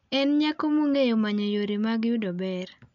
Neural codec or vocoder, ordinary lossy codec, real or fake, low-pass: none; none; real; 7.2 kHz